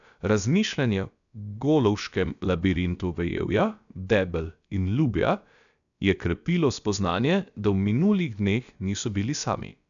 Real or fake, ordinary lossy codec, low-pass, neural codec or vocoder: fake; none; 7.2 kHz; codec, 16 kHz, about 1 kbps, DyCAST, with the encoder's durations